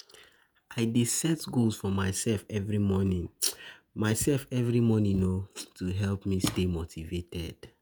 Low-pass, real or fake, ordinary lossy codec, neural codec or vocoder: none; fake; none; vocoder, 48 kHz, 128 mel bands, Vocos